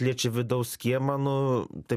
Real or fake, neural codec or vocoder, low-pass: real; none; 14.4 kHz